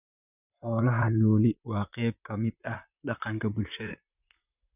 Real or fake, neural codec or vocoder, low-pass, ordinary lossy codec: fake; vocoder, 44.1 kHz, 128 mel bands, Pupu-Vocoder; 3.6 kHz; none